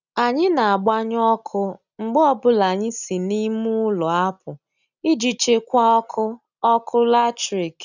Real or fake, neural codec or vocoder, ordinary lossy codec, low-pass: real; none; none; 7.2 kHz